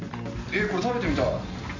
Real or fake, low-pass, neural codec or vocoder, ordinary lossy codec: real; 7.2 kHz; none; MP3, 64 kbps